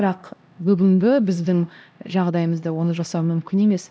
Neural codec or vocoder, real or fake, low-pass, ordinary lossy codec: codec, 16 kHz, 1 kbps, X-Codec, HuBERT features, trained on LibriSpeech; fake; none; none